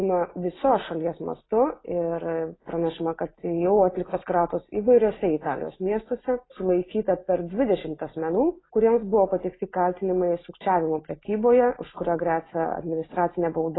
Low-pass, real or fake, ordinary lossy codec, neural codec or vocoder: 7.2 kHz; real; AAC, 16 kbps; none